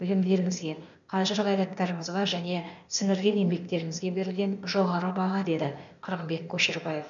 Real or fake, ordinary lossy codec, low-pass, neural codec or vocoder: fake; none; 7.2 kHz; codec, 16 kHz, 0.8 kbps, ZipCodec